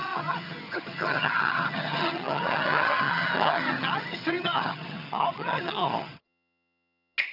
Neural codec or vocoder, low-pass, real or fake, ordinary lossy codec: vocoder, 22.05 kHz, 80 mel bands, HiFi-GAN; 5.4 kHz; fake; none